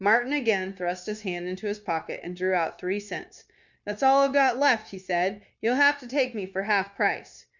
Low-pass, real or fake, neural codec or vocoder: 7.2 kHz; fake; codec, 16 kHz, 0.9 kbps, LongCat-Audio-Codec